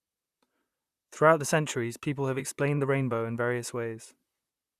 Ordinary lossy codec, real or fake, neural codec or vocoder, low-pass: Opus, 64 kbps; fake; vocoder, 44.1 kHz, 128 mel bands, Pupu-Vocoder; 14.4 kHz